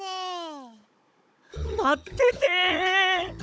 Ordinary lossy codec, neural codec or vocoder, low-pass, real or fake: none; codec, 16 kHz, 16 kbps, FunCodec, trained on Chinese and English, 50 frames a second; none; fake